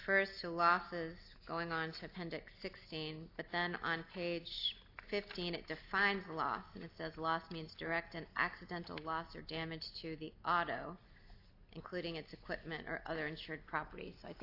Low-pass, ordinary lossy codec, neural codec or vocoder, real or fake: 5.4 kHz; AAC, 32 kbps; none; real